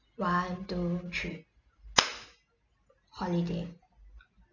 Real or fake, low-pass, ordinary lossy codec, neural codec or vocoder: real; 7.2 kHz; none; none